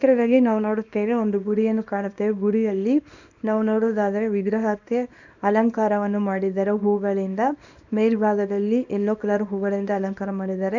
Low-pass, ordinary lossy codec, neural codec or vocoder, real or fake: 7.2 kHz; none; codec, 24 kHz, 0.9 kbps, WavTokenizer, small release; fake